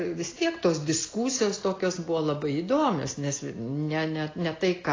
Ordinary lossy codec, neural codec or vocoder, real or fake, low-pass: AAC, 32 kbps; none; real; 7.2 kHz